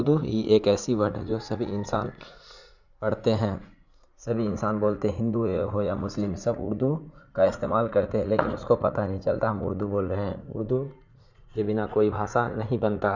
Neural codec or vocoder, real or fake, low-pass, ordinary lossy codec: vocoder, 44.1 kHz, 80 mel bands, Vocos; fake; 7.2 kHz; none